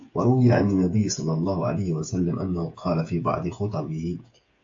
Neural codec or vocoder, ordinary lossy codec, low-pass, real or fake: codec, 16 kHz, 8 kbps, FreqCodec, smaller model; AAC, 48 kbps; 7.2 kHz; fake